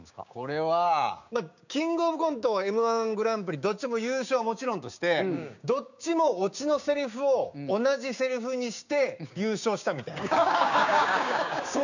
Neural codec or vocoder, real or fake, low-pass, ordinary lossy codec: codec, 16 kHz, 6 kbps, DAC; fake; 7.2 kHz; none